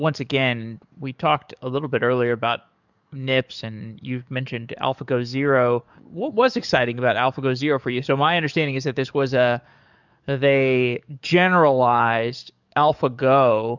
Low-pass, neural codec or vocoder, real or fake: 7.2 kHz; codec, 44.1 kHz, 7.8 kbps, DAC; fake